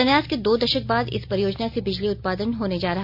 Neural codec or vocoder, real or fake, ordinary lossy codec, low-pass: none; real; none; 5.4 kHz